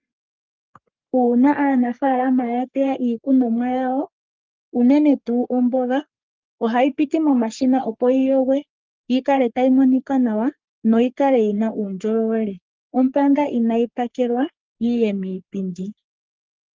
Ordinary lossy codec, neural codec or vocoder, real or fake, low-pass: Opus, 32 kbps; codec, 44.1 kHz, 3.4 kbps, Pupu-Codec; fake; 7.2 kHz